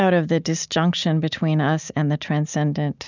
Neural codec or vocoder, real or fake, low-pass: none; real; 7.2 kHz